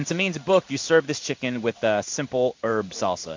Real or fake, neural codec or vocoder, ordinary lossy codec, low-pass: fake; codec, 16 kHz in and 24 kHz out, 1 kbps, XY-Tokenizer; MP3, 48 kbps; 7.2 kHz